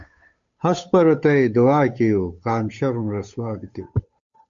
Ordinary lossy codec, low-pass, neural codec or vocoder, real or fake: MP3, 64 kbps; 7.2 kHz; codec, 16 kHz, 8 kbps, FunCodec, trained on Chinese and English, 25 frames a second; fake